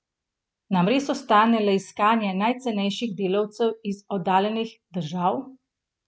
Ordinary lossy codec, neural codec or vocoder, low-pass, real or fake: none; none; none; real